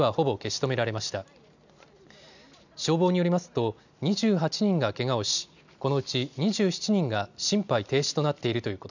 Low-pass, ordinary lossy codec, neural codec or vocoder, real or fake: 7.2 kHz; none; none; real